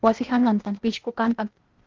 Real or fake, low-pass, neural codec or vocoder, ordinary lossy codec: fake; 7.2 kHz; codec, 16 kHz, 0.8 kbps, ZipCodec; Opus, 16 kbps